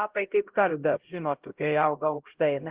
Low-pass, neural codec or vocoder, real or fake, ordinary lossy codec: 3.6 kHz; codec, 16 kHz, 0.5 kbps, X-Codec, HuBERT features, trained on LibriSpeech; fake; Opus, 16 kbps